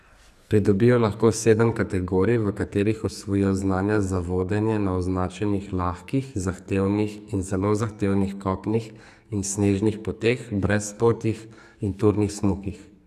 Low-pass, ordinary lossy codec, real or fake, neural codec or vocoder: 14.4 kHz; none; fake; codec, 44.1 kHz, 2.6 kbps, SNAC